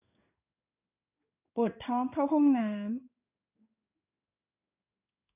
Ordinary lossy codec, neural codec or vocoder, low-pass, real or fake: none; codec, 16 kHz in and 24 kHz out, 1 kbps, XY-Tokenizer; 3.6 kHz; fake